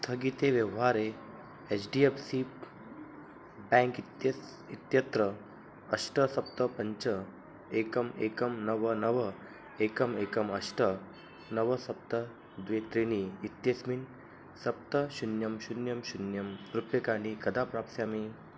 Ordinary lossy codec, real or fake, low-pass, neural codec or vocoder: none; real; none; none